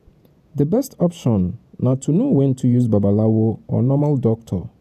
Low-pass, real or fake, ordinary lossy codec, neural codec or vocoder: 14.4 kHz; fake; none; vocoder, 48 kHz, 128 mel bands, Vocos